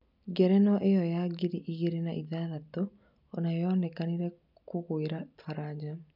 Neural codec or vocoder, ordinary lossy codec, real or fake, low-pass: none; none; real; 5.4 kHz